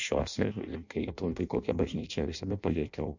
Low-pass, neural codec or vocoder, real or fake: 7.2 kHz; codec, 16 kHz in and 24 kHz out, 0.6 kbps, FireRedTTS-2 codec; fake